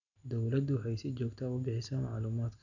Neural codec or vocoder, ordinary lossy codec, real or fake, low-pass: none; none; real; 7.2 kHz